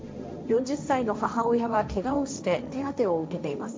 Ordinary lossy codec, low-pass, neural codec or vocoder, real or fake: none; none; codec, 16 kHz, 1.1 kbps, Voila-Tokenizer; fake